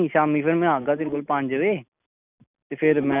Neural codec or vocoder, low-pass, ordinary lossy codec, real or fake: none; 3.6 kHz; none; real